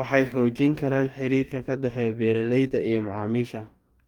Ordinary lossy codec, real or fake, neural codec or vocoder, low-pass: Opus, 32 kbps; fake; codec, 44.1 kHz, 2.6 kbps, DAC; 19.8 kHz